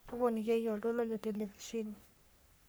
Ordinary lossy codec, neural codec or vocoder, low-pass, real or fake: none; codec, 44.1 kHz, 3.4 kbps, Pupu-Codec; none; fake